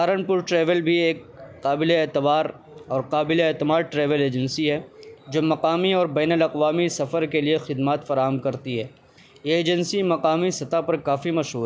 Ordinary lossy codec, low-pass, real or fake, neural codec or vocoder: none; none; real; none